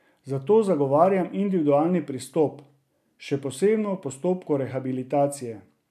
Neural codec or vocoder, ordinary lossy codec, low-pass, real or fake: none; none; 14.4 kHz; real